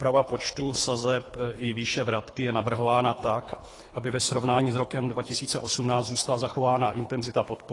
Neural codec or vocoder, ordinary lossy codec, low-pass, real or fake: codec, 24 kHz, 3 kbps, HILCodec; AAC, 32 kbps; 10.8 kHz; fake